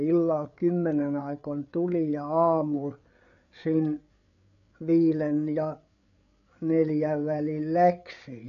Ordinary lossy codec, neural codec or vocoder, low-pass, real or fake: none; codec, 16 kHz, 8 kbps, FreqCodec, larger model; 7.2 kHz; fake